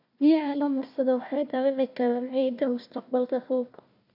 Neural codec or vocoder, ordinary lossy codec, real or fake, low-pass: codec, 16 kHz, 1 kbps, FunCodec, trained on Chinese and English, 50 frames a second; none; fake; 5.4 kHz